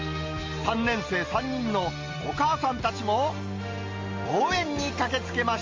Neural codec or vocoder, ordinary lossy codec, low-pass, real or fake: none; Opus, 32 kbps; 7.2 kHz; real